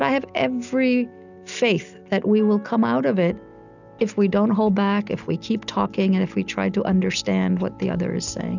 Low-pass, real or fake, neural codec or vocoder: 7.2 kHz; real; none